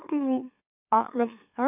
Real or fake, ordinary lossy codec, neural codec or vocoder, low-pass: fake; none; autoencoder, 44.1 kHz, a latent of 192 numbers a frame, MeloTTS; 3.6 kHz